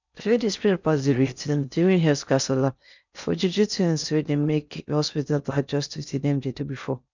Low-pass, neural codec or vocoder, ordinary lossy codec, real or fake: 7.2 kHz; codec, 16 kHz in and 24 kHz out, 0.6 kbps, FocalCodec, streaming, 4096 codes; none; fake